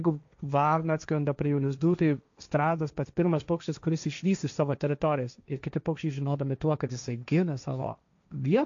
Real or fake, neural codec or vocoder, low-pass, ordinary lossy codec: fake; codec, 16 kHz, 1.1 kbps, Voila-Tokenizer; 7.2 kHz; MP3, 64 kbps